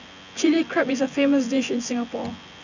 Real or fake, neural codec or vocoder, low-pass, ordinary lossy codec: fake; vocoder, 24 kHz, 100 mel bands, Vocos; 7.2 kHz; none